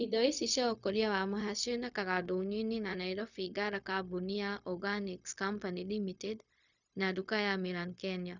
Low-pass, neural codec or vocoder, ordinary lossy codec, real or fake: 7.2 kHz; codec, 16 kHz, 0.4 kbps, LongCat-Audio-Codec; none; fake